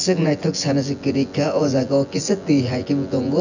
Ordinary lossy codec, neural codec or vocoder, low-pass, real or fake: none; vocoder, 24 kHz, 100 mel bands, Vocos; 7.2 kHz; fake